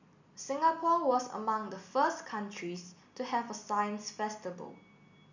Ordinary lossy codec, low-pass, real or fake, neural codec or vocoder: none; 7.2 kHz; real; none